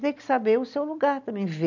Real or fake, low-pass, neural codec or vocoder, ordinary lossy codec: real; 7.2 kHz; none; none